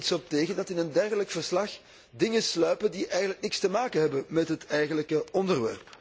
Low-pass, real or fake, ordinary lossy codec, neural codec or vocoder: none; real; none; none